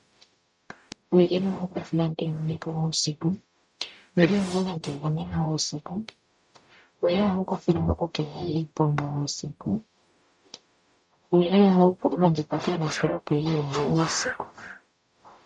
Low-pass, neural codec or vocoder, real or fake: 10.8 kHz; codec, 44.1 kHz, 0.9 kbps, DAC; fake